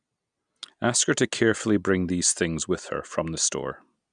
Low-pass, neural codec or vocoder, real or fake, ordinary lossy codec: 9.9 kHz; none; real; none